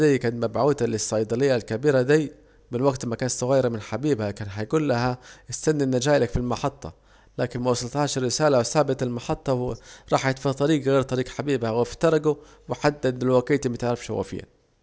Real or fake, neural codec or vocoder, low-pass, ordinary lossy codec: real; none; none; none